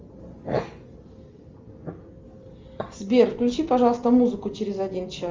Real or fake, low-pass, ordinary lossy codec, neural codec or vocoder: real; 7.2 kHz; Opus, 32 kbps; none